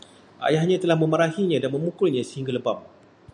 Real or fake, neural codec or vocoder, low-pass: real; none; 10.8 kHz